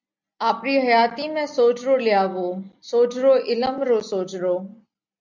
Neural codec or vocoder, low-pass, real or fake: none; 7.2 kHz; real